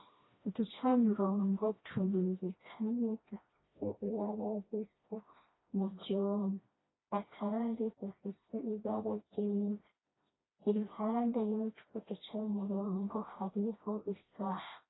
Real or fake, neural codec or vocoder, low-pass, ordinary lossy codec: fake; codec, 16 kHz, 1 kbps, FreqCodec, smaller model; 7.2 kHz; AAC, 16 kbps